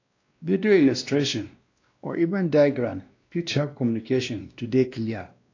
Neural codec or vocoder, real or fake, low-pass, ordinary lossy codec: codec, 16 kHz, 1 kbps, X-Codec, WavLM features, trained on Multilingual LibriSpeech; fake; 7.2 kHz; AAC, 48 kbps